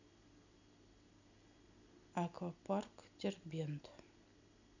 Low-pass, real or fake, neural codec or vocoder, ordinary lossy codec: 7.2 kHz; real; none; none